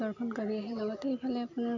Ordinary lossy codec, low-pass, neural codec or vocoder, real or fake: none; 7.2 kHz; none; real